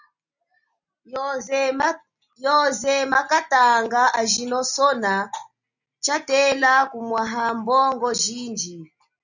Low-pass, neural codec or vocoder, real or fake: 7.2 kHz; none; real